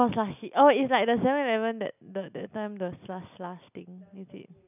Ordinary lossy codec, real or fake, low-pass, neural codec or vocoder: none; real; 3.6 kHz; none